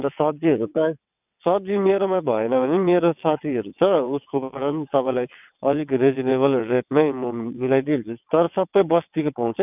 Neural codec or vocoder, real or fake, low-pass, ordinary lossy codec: vocoder, 22.05 kHz, 80 mel bands, WaveNeXt; fake; 3.6 kHz; none